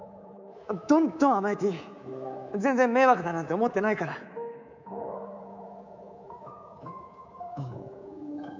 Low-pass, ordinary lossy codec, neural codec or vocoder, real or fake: 7.2 kHz; none; codec, 24 kHz, 3.1 kbps, DualCodec; fake